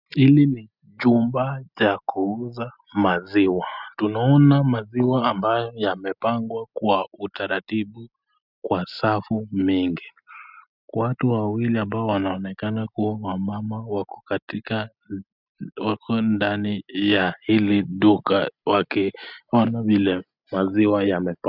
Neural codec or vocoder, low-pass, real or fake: none; 5.4 kHz; real